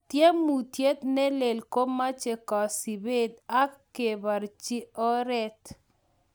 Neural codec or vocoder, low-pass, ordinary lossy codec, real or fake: none; none; none; real